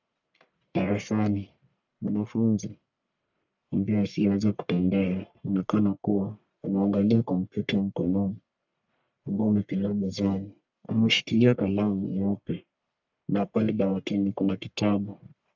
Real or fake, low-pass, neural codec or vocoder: fake; 7.2 kHz; codec, 44.1 kHz, 1.7 kbps, Pupu-Codec